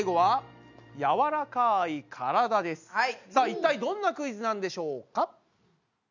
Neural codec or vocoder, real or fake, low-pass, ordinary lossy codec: none; real; 7.2 kHz; none